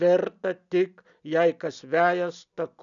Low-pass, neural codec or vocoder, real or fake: 7.2 kHz; none; real